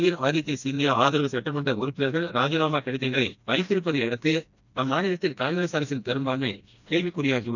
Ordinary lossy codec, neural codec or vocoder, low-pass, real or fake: none; codec, 16 kHz, 1 kbps, FreqCodec, smaller model; 7.2 kHz; fake